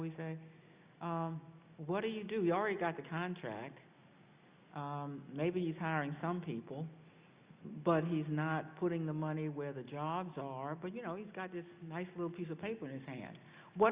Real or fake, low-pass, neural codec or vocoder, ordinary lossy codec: real; 3.6 kHz; none; Opus, 64 kbps